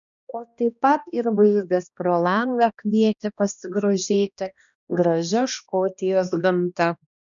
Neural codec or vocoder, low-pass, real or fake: codec, 16 kHz, 1 kbps, X-Codec, HuBERT features, trained on balanced general audio; 7.2 kHz; fake